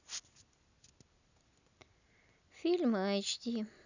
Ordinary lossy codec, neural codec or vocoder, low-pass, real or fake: none; none; 7.2 kHz; real